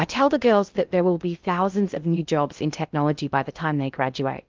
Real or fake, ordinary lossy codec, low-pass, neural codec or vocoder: fake; Opus, 24 kbps; 7.2 kHz; codec, 16 kHz in and 24 kHz out, 0.6 kbps, FocalCodec, streaming, 2048 codes